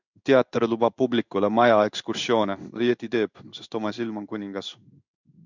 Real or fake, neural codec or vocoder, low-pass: fake; codec, 16 kHz in and 24 kHz out, 1 kbps, XY-Tokenizer; 7.2 kHz